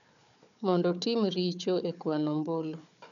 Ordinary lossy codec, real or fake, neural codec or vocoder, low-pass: none; fake; codec, 16 kHz, 4 kbps, FunCodec, trained on Chinese and English, 50 frames a second; 7.2 kHz